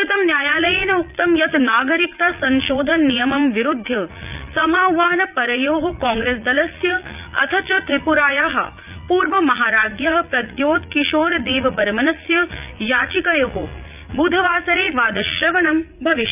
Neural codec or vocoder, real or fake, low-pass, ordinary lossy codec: vocoder, 44.1 kHz, 80 mel bands, Vocos; fake; 3.6 kHz; none